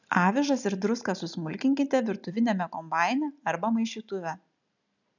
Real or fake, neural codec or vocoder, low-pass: real; none; 7.2 kHz